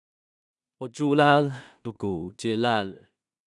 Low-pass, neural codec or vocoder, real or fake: 10.8 kHz; codec, 16 kHz in and 24 kHz out, 0.4 kbps, LongCat-Audio-Codec, two codebook decoder; fake